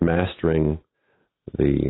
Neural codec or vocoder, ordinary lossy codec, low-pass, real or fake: none; AAC, 16 kbps; 7.2 kHz; real